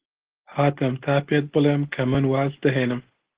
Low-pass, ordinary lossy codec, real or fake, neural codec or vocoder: 3.6 kHz; Opus, 16 kbps; real; none